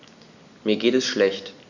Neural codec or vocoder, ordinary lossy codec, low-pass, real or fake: none; none; 7.2 kHz; real